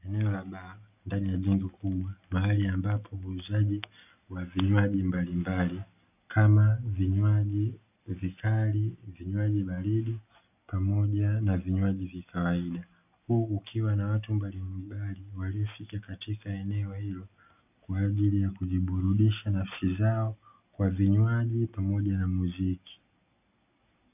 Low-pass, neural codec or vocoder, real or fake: 3.6 kHz; none; real